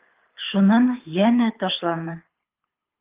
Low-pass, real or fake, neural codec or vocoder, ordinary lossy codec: 3.6 kHz; fake; codec, 24 kHz, 6 kbps, HILCodec; Opus, 24 kbps